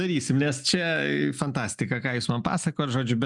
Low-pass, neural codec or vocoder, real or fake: 10.8 kHz; none; real